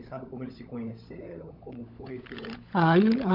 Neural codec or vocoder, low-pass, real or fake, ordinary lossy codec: codec, 16 kHz, 16 kbps, FunCodec, trained on LibriTTS, 50 frames a second; 5.4 kHz; fake; none